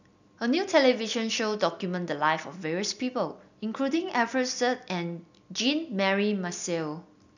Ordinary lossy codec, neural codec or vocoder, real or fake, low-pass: none; none; real; 7.2 kHz